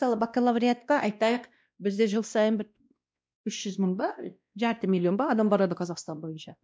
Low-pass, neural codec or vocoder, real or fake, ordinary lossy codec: none; codec, 16 kHz, 1 kbps, X-Codec, WavLM features, trained on Multilingual LibriSpeech; fake; none